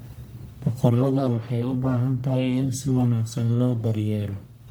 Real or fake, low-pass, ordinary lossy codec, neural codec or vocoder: fake; none; none; codec, 44.1 kHz, 1.7 kbps, Pupu-Codec